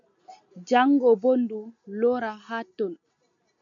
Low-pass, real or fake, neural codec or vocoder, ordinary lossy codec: 7.2 kHz; real; none; MP3, 64 kbps